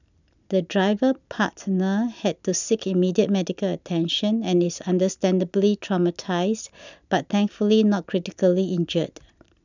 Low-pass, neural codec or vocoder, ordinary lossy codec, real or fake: 7.2 kHz; none; none; real